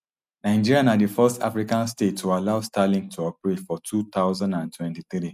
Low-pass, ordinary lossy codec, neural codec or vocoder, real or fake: 14.4 kHz; none; none; real